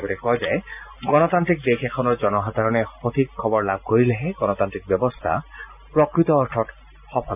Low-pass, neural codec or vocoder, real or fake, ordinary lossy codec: 3.6 kHz; none; real; none